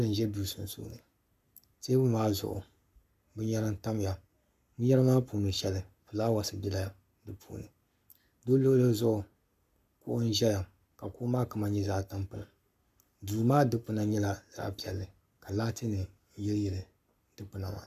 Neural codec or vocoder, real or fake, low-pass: codec, 44.1 kHz, 7.8 kbps, Pupu-Codec; fake; 14.4 kHz